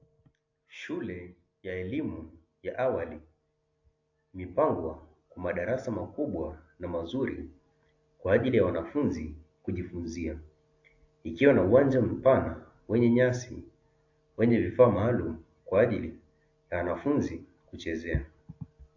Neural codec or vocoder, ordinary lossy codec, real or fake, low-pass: none; AAC, 48 kbps; real; 7.2 kHz